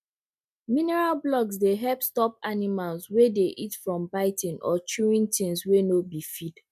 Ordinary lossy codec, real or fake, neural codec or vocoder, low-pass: none; real; none; 14.4 kHz